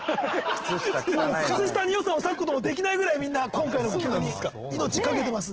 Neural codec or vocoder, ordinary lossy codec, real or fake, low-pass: none; Opus, 16 kbps; real; 7.2 kHz